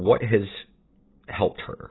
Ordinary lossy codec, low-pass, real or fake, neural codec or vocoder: AAC, 16 kbps; 7.2 kHz; real; none